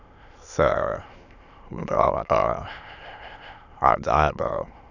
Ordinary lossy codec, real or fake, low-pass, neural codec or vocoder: none; fake; 7.2 kHz; autoencoder, 22.05 kHz, a latent of 192 numbers a frame, VITS, trained on many speakers